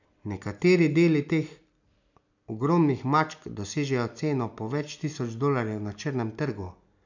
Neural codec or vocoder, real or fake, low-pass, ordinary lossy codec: none; real; 7.2 kHz; none